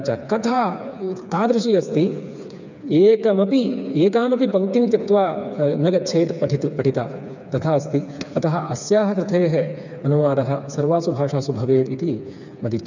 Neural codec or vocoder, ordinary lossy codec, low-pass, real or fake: codec, 16 kHz, 4 kbps, FreqCodec, smaller model; none; 7.2 kHz; fake